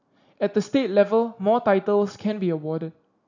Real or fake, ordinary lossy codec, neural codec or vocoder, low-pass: real; none; none; 7.2 kHz